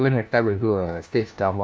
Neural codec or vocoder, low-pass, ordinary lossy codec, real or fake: codec, 16 kHz, 1 kbps, FunCodec, trained on LibriTTS, 50 frames a second; none; none; fake